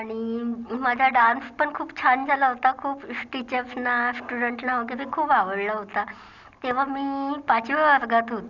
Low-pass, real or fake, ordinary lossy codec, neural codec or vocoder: 7.2 kHz; real; Opus, 64 kbps; none